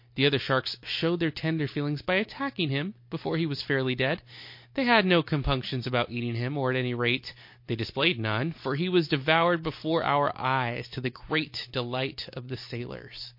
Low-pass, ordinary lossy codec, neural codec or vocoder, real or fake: 5.4 kHz; MP3, 32 kbps; none; real